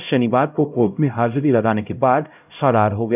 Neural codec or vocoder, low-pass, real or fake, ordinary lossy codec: codec, 16 kHz, 0.5 kbps, X-Codec, HuBERT features, trained on LibriSpeech; 3.6 kHz; fake; none